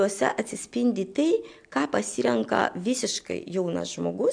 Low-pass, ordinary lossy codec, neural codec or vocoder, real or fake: 9.9 kHz; AAC, 64 kbps; none; real